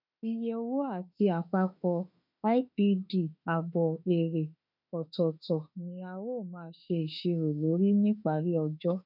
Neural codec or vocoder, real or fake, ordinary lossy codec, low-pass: autoencoder, 48 kHz, 32 numbers a frame, DAC-VAE, trained on Japanese speech; fake; none; 5.4 kHz